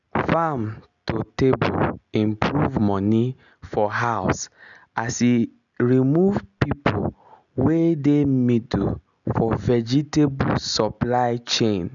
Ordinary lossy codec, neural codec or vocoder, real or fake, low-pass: none; none; real; 7.2 kHz